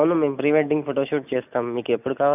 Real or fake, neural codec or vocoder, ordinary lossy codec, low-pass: real; none; none; 3.6 kHz